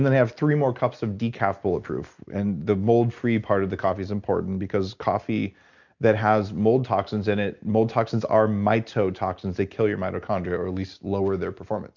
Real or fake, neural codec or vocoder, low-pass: real; none; 7.2 kHz